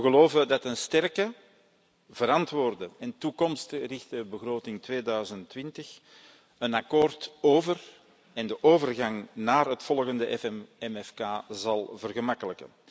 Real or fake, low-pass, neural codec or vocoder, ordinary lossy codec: real; none; none; none